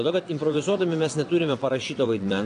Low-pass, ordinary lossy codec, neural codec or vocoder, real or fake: 9.9 kHz; AAC, 48 kbps; vocoder, 22.05 kHz, 80 mel bands, WaveNeXt; fake